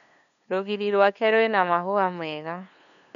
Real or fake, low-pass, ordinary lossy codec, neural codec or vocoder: fake; 7.2 kHz; none; codec, 16 kHz, 2 kbps, FunCodec, trained on LibriTTS, 25 frames a second